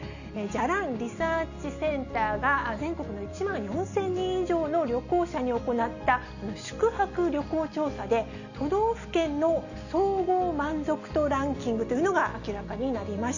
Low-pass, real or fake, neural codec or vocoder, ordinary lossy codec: 7.2 kHz; real; none; none